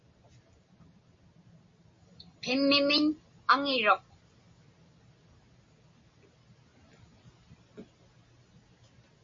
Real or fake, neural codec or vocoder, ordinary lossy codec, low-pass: real; none; MP3, 32 kbps; 7.2 kHz